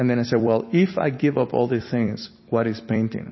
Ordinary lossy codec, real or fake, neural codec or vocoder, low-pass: MP3, 24 kbps; fake; vocoder, 44.1 kHz, 128 mel bands every 256 samples, BigVGAN v2; 7.2 kHz